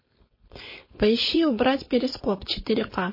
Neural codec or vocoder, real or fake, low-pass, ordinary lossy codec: codec, 16 kHz, 4.8 kbps, FACodec; fake; 5.4 kHz; MP3, 24 kbps